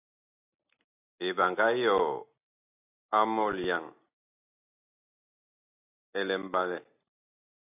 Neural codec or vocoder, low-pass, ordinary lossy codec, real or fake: none; 3.6 kHz; AAC, 32 kbps; real